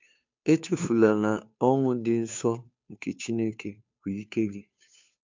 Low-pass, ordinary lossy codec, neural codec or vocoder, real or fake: 7.2 kHz; MP3, 64 kbps; codec, 16 kHz, 2 kbps, FunCodec, trained on Chinese and English, 25 frames a second; fake